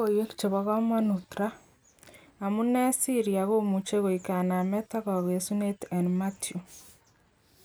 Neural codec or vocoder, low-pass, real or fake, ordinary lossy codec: none; none; real; none